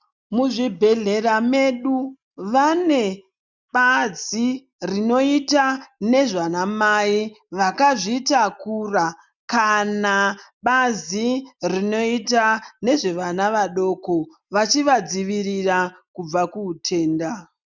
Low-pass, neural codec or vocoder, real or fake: 7.2 kHz; none; real